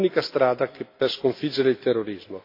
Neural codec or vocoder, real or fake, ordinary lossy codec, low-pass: none; real; AAC, 32 kbps; 5.4 kHz